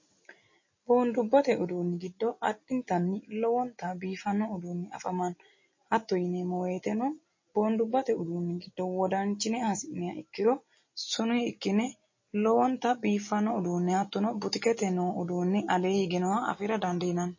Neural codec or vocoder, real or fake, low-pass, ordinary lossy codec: none; real; 7.2 kHz; MP3, 32 kbps